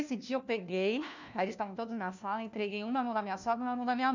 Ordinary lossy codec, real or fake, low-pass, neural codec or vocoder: none; fake; 7.2 kHz; codec, 16 kHz, 1 kbps, FunCodec, trained on LibriTTS, 50 frames a second